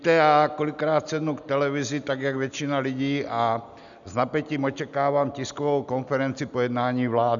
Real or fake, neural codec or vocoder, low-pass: real; none; 7.2 kHz